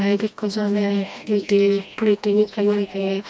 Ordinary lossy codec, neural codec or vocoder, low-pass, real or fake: none; codec, 16 kHz, 1 kbps, FreqCodec, smaller model; none; fake